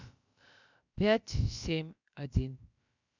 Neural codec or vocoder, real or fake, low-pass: codec, 16 kHz, about 1 kbps, DyCAST, with the encoder's durations; fake; 7.2 kHz